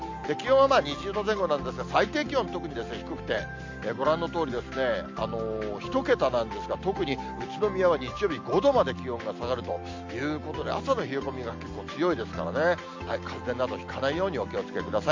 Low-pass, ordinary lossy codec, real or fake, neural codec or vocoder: 7.2 kHz; MP3, 48 kbps; real; none